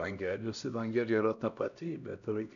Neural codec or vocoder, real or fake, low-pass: codec, 16 kHz, 1 kbps, X-Codec, HuBERT features, trained on LibriSpeech; fake; 7.2 kHz